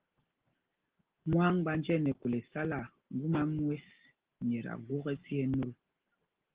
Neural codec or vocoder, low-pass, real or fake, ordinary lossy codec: none; 3.6 kHz; real; Opus, 16 kbps